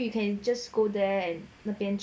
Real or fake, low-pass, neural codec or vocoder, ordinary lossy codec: real; none; none; none